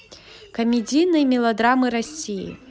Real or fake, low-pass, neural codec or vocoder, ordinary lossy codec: real; none; none; none